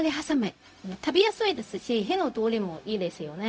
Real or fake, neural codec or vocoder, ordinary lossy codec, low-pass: fake; codec, 16 kHz, 0.4 kbps, LongCat-Audio-Codec; none; none